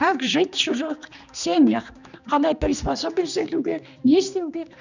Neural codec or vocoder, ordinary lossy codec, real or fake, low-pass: codec, 16 kHz, 2 kbps, X-Codec, HuBERT features, trained on general audio; none; fake; 7.2 kHz